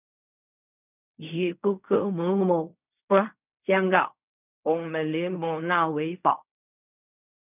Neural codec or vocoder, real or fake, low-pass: codec, 16 kHz in and 24 kHz out, 0.4 kbps, LongCat-Audio-Codec, fine tuned four codebook decoder; fake; 3.6 kHz